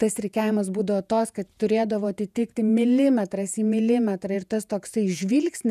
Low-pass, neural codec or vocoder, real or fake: 14.4 kHz; vocoder, 48 kHz, 128 mel bands, Vocos; fake